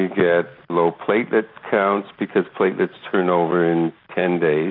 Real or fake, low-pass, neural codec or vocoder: real; 5.4 kHz; none